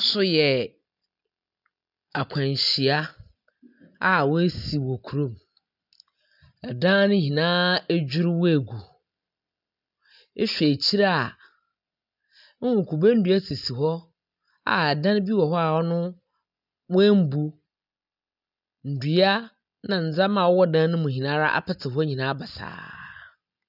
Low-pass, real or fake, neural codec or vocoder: 5.4 kHz; real; none